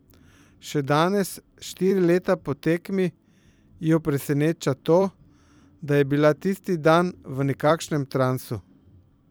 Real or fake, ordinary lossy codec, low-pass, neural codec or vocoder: fake; none; none; vocoder, 44.1 kHz, 128 mel bands every 256 samples, BigVGAN v2